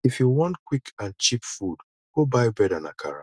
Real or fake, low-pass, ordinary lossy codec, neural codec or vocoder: real; none; none; none